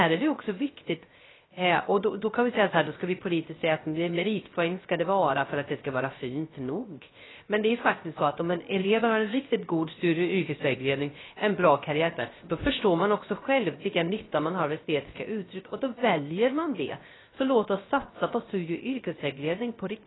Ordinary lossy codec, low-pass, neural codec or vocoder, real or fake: AAC, 16 kbps; 7.2 kHz; codec, 16 kHz, 0.3 kbps, FocalCodec; fake